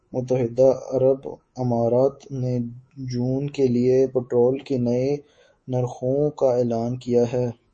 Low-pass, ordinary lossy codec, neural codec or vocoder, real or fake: 10.8 kHz; MP3, 32 kbps; codec, 24 kHz, 3.1 kbps, DualCodec; fake